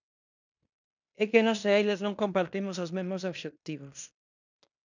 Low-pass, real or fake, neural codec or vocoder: 7.2 kHz; fake; codec, 16 kHz in and 24 kHz out, 0.9 kbps, LongCat-Audio-Codec, fine tuned four codebook decoder